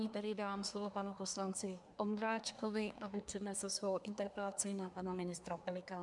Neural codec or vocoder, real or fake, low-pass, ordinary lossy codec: codec, 24 kHz, 1 kbps, SNAC; fake; 10.8 kHz; MP3, 96 kbps